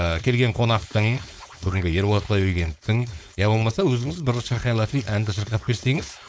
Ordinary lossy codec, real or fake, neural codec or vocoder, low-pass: none; fake; codec, 16 kHz, 4.8 kbps, FACodec; none